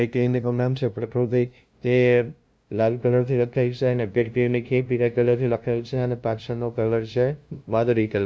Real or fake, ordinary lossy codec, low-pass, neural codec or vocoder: fake; none; none; codec, 16 kHz, 0.5 kbps, FunCodec, trained on LibriTTS, 25 frames a second